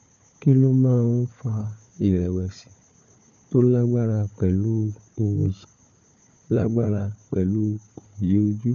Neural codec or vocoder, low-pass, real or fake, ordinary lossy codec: codec, 16 kHz, 4 kbps, FunCodec, trained on Chinese and English, 50 frames a second; 7.2 kHz; fake; none